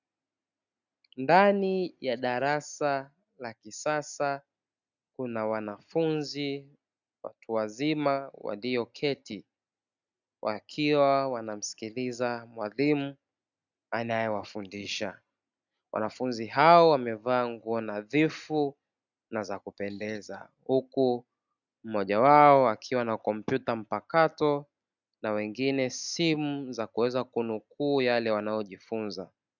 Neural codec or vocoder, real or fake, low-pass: none; real; 7.2 kHz